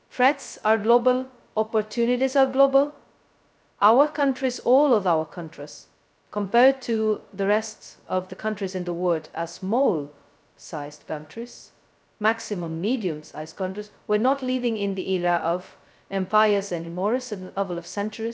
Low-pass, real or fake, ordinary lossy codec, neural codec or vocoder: none; fake; none; codec, 16 kHz, 0.2 kbps, FocalCodec